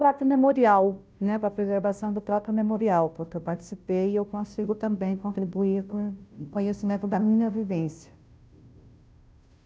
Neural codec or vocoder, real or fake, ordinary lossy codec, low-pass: codec, 16 kHz, 0.5 kbps, FunCodec, trained on Chinese and English, 25 frames a second; fake; none; none